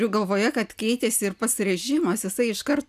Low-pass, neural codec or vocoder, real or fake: 14.4 kHz; none; real